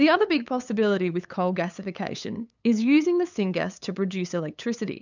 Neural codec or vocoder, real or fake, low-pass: codec, 16 kHz, 8 kbps, FunCodec, trained on LibriTTS, 25 frames a second; fake; 7.2 kHz